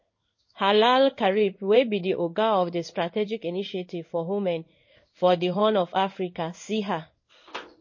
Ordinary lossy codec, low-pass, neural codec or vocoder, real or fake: MP3, 32 kbps; 7.2 kHz; codec, 16 kHz in and 24 kHz out, 1 kbps, XY-Tokenizer; fake